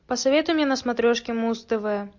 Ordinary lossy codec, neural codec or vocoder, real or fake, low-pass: MP3, 48 kbps; none; real; 7.2 kHz